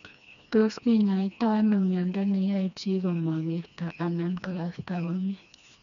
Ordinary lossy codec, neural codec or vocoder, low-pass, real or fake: none; codec, 16 kHz, 2 kbps, FreqCodec, smaller model; 7.2 kHz; fake